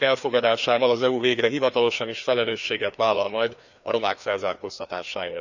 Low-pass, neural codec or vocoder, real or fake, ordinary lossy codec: 7.2 kHz; codec, 16 kHz, 2 kbps, FreqCodec, larger model; fake; none